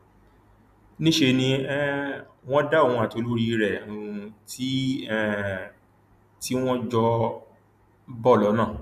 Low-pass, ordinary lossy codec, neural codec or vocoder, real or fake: 14.4 kHz; none; none; real